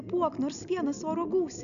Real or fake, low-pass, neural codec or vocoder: real; 7.2 kHz; none